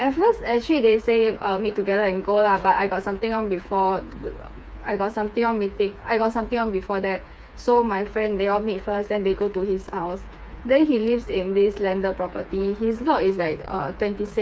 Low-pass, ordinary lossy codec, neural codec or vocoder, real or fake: none; none; codec, 16 kHz, 4 kbps, FreqCodec, smaller model; fake